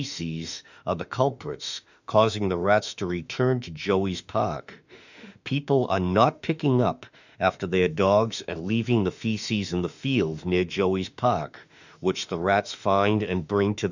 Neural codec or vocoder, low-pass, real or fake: autoencoder, 48 kHz, 32 numbers a frame, DAC-VAE, trained on Japanese speech; 7.2 kHz; fake